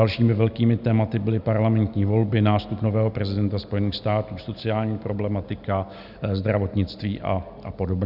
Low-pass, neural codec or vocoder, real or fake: 5.4 kHz; none; real